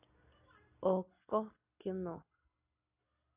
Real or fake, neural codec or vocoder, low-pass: real; none; 3.6 kHz